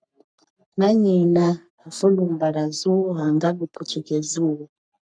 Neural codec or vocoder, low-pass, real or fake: codec, 44.1 kHz, 3.4 kbps, Pupu-Codec; 9.9 kHz; fake